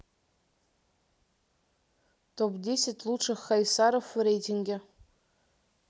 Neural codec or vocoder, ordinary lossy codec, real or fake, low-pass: none; none; real; none